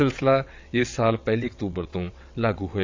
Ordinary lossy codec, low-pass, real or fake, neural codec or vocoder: MP3, 64 kbps; 7.2 kHz; fake; vocoder, 22.05 kHz, 80 mel bands, WaveNeXt